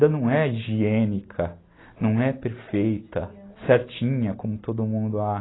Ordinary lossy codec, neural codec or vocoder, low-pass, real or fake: AAC, 16 kbps; none; 7.2 kHz; real